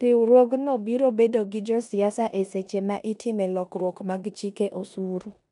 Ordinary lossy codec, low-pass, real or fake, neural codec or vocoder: none; 10.8 kHz; fake; codec, 16 kHz in and 24 kHz out, 0.9 kbps, LongCat-Audio-Codec, four codebook decoder